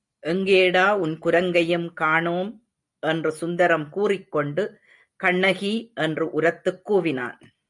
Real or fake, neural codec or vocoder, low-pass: real; none; 10.8 kHz